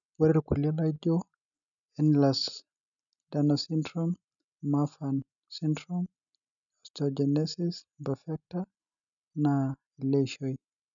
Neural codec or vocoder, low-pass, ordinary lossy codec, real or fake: none; 7.2 kHz; none; real